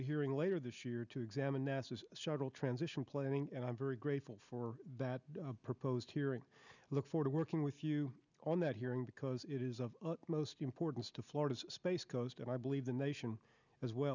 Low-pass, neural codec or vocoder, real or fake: 7.2 kHz; none; real